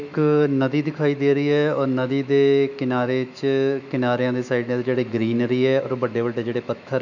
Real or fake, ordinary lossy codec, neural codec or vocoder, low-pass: fake; none; autoencoder, 48 kHz, 128 numbers a frame, DAC-VAE, trained on Japanese speech; 7.2 kHz